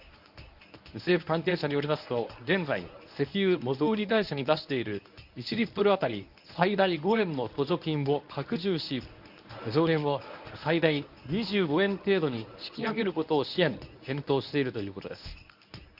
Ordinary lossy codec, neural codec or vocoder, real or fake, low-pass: none; codec, 24 kHz, 0.9 kbps, WavTokenizer, medium speech release version 1; fake; 5.4 kHz